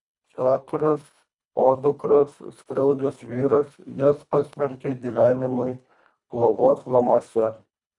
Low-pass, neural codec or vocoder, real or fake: 10.8 kHz; codec, 24 kHz, 1.5 kbps, HILCodec; fake